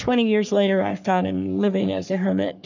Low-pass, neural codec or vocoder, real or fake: 7.2 kHz; codec, 44.1 kHz, 3.4 kbps, Pupu-Codec; fake